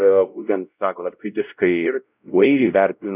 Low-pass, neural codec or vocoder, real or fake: 3.6 kHz; codec, 16 kHz, 0.5 kbps, X-Codec, WavLM features, trained on Multilingual LibriSpeech; fake